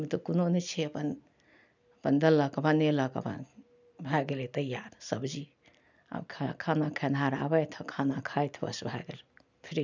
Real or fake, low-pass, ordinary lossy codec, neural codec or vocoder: real; 7.2 kHz; none; none